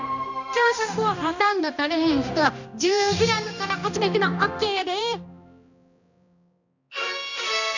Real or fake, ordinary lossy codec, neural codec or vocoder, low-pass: fake; none; codec, 16 kHz, 1 kbps, X-Codec, HuBERT features, trained on balanced general audio; 7.2 kHz